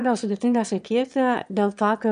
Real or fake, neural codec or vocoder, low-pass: fake; autoencoder, 22.05 kHz, a latent of 192 numbers a frame, VITS, trained on one speaker; 9.9 kHz